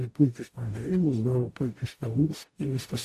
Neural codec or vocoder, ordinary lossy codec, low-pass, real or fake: codec, 44.1 kHz, 0.9 kbps, DAC; AAC, 64 kbps; 14.4 kHz; fake